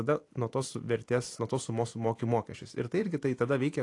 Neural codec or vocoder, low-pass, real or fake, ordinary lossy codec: vocoder, 44.1 kHz, 128 mel bands every 256 samples, BigVGAN v2; 10.8 kHz; fake; AAC, 48 kbps